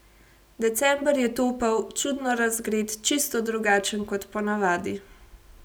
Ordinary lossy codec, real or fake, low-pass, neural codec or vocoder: none; real; none; none